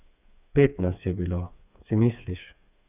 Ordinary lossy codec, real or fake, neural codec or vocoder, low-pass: none; fake; codec, 16 kHz, 4 kbps, FreqCodec, smaller model; 3.6 kHz